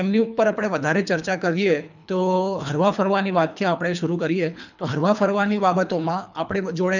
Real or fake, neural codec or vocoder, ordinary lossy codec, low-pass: fake; codec, 24 kHz, 3 kbps, HILCodec; none; 7.2 kHz